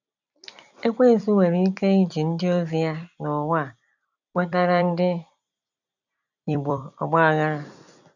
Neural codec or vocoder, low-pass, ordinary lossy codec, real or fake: vocoder, 44.1 kHz, 128 mel bands every 256 samples, BigVGAN v2; 7.2 kHz; none; fake